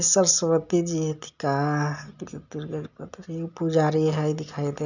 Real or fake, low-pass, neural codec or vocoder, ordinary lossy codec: real; 7.2 kHz; none; none